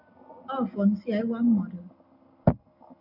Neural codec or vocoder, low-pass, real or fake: none; 5.4 kHz; real